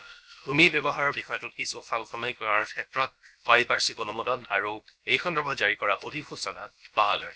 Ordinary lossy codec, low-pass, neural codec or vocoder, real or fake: none; none; codec, 16 kHz, about 1 kbps, DyCAST, with the encoder's durations; fake